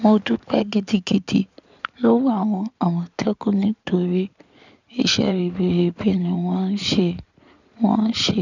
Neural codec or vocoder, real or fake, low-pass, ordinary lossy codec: codec, 16 kHz in and 24 kHz out, 2.2 kbps, FireRedTTS-2 codec; fake; 7.2 kHz; none